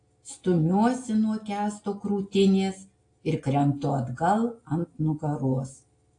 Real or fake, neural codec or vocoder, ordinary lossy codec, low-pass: real; none; AAC, 48 kbps; 9.9 kHz